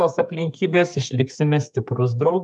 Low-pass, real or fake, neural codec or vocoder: 10.8 kHz; fake; codec, 32 kHz, 1.9 kbps, SNAC